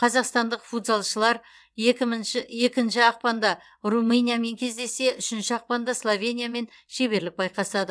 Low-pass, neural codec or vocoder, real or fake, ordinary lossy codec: none; vocoder, 22.05 kHz, 80 mel bands, WaveNeXt; fake; none